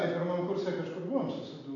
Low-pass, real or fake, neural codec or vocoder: 7.2 kHz; real; none